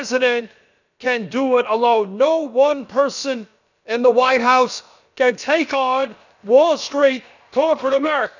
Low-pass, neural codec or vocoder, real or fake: 7.2 kHz; codec, 16 kHz, about 1 kbps, DyCAST, with the encoder's durations; fake